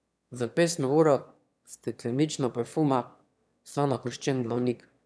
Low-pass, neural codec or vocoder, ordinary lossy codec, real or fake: none; autoencoder, 22.05 kHz, a latent of 192 numbers a frame, VITS, trained on one speaker; none; fake